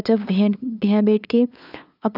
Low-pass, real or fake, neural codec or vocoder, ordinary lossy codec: 5.4 kHz; fake; codec, 16 kHz, 2 kbps, FunCodec, trained on LibriTTS, 25 frames a second; none